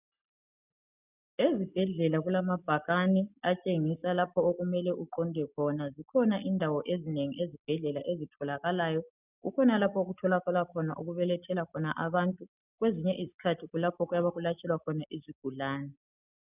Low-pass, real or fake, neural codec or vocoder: 3.6 kHz; real; none